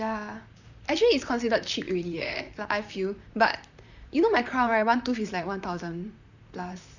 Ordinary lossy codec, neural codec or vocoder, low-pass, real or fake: none; vocoder, 44.1 kHz, 128 mel bands, Pupu-Vocoder; 7.2 kHz; fake